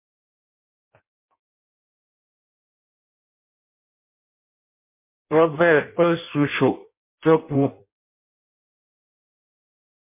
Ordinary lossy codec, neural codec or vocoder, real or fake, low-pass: MP3, 24 kbps; codec, 16 kHz in and 24 kHz out, 0.6 kbps, FireRedTTS-2 codec; fake; 3.6 kHz